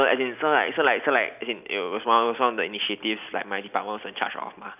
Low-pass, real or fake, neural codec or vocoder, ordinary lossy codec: 3.6 kHz; real; none; none